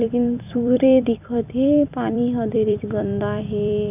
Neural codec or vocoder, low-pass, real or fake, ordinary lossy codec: vocoder, 44.1 kHz, 128 mel bands every 256 samples, BigVGAN v2; 3.6 kHz; fake; none